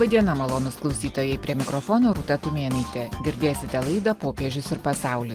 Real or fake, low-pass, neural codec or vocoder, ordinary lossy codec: real; 14.4 kHz; none; Opus, 24 kbps